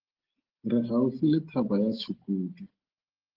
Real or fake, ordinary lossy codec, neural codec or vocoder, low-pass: real; Opus, 16 kbps; none; 5.4 kHz